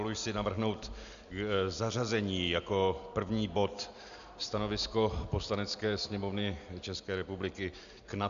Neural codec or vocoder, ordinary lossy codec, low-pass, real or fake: none; AAC, 96 kbps; 7.2 kHz; real